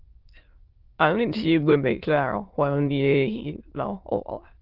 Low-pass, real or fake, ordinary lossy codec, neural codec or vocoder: 5.4 kHz; fake; Opus, 16 kbps; autoencoder, 22.05 kHz, a latent of 192 numbers a frame, VITS, trained on many speakers